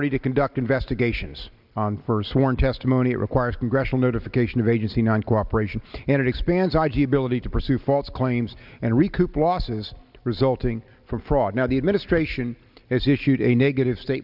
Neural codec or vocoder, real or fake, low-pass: none; real; 5.4 kHz